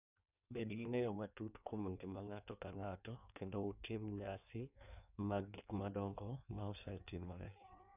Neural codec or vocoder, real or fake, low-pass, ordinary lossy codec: codec, 16 kHz in and 24 kHz out, 1.1 kbps, FireRedTTS-2 codec; fake; 3.6 kHz; none